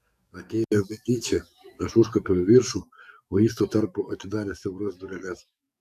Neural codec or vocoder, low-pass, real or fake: codec, 44.1 kHz, 7.8 kbps, DAC; 14.4 kHz; fake